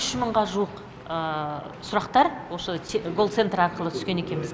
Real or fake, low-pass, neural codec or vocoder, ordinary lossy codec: real; none; none; none